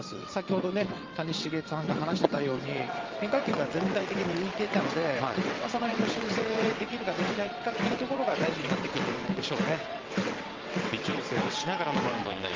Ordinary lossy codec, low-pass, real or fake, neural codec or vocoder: Opus, 32 kbps; 7.2 kHz; fake; vocoder, 22.05 kHz, 80 mel bands, WaveNeXt